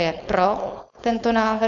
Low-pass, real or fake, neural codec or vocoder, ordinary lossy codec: 7.2 kHz; fake; codec, 16 kHz, 4.8 kbps, FACodec; Opus, 64 kbps